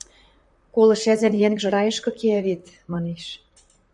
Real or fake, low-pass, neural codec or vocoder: fake; 10.8 kHz; vocoder, 44.1 kHz, 128 mel bands, Pupu-Vocoder